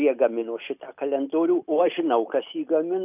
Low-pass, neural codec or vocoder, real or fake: 3.6 kHz; none; real